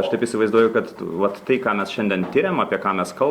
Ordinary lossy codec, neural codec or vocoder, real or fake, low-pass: Opus, 64 kbps; none; real; 19.8 kHz